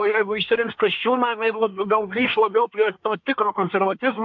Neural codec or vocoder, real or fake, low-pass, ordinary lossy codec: codec, 24 kHz, 1 kbps, SNAC; fake; 7.2 kHz; MP3, 64 kbps